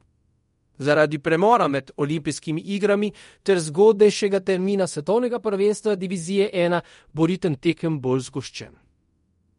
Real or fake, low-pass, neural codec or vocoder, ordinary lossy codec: fake; 10.8 kHz; codec, 24 kHz, 0.5 kbps, DualCodec; MP3, 48 kbps